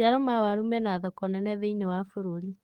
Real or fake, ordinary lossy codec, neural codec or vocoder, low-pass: fake; Opus, 24 kbps; codec, 44.1 kHz, 7.8 kbps, DAC; 19.8 kHz